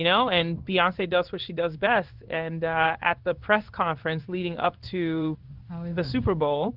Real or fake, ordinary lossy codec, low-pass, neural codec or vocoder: fake; Opus, 32 kbps; 5.4 kHz; codec, 16 kHz in and 24 kHz out, 1 kbps, XY-Tokenizer